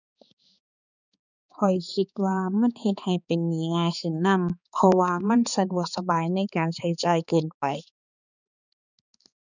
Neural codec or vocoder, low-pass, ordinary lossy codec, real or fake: codec, 16 kHz, 4 kbps, X-Codec, HuBERT features, trained on balanced general audio; 7.2 kHz; none; fake